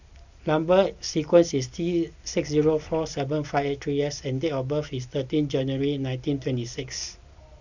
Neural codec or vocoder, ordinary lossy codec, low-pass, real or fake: none; none; 7.2 kHz; real